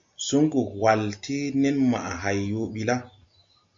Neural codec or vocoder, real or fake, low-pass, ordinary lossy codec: none; real; 7.2 kHz; MP3, 48 kbps